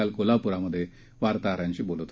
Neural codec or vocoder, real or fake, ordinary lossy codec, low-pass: none; real; none; none